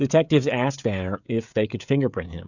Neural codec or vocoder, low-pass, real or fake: codec, 16 kHz, 16 kbps, FreqCodec, smaller model; 7.2 kHz; fake